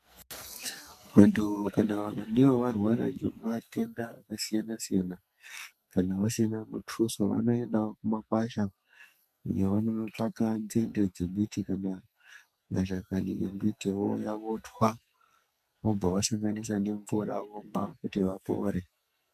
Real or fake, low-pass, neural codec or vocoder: fake; 14.4 kHz; codec, 44.1 kHz, 2.6 kbps, SNAC